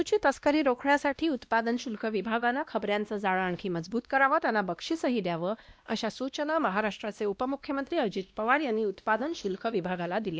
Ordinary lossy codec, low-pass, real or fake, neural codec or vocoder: none; none; fake; codec, 16 kHz, 1 kbps, X-Codec, WavLM features, trained on Multilingual LibriSpeech